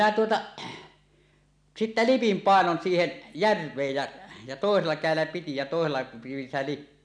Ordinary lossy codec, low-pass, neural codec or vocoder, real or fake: none; 9.9 kHz; none; real